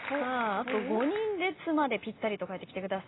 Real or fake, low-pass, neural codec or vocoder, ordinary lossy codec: real; 7.2 kHz; none; AAC, 16 kbps